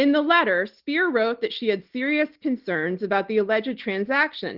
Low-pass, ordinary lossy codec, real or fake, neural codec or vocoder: 5.4 kHz; Opus, 16 kbps; real; none